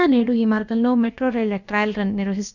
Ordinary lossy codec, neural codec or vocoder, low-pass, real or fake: none; codec, 16 kHz, about 1 kbps, DyCAST, with the encoder's durations; 7.2 kHz; fake